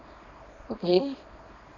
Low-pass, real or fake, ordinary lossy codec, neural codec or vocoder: 7.2 kHz; fake; none; codec, 24 kHz, 0.9 kbps, WavTokenizer, small release